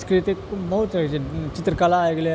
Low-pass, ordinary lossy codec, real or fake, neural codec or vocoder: none; none; real; none